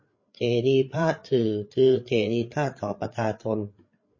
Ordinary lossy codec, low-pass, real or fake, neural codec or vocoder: MP3, 32 kbps; 7.2 kHz; fake; codec, 16 kHz, 8 kbps, FreqCodec, larger model